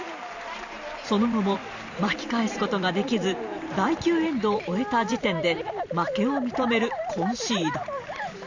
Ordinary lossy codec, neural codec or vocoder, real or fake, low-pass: Opus, 64 kbps; vocoder, 44.1 kHz, 128 mel bands every 512 samples, BigVGAN v2; fake; 7.2 kHz